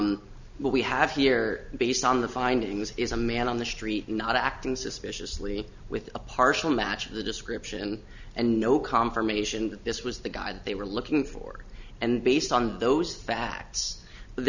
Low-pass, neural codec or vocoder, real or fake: 7.2 kHz; none; real